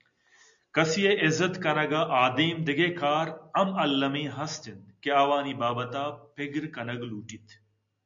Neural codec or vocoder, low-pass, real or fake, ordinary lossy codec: none; 7.2 kHz; real; MP3, 64 kbps